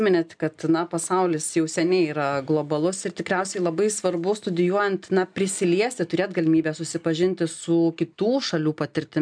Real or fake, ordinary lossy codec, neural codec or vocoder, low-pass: real; MP3, 96 kbps; none; 9.9 kHz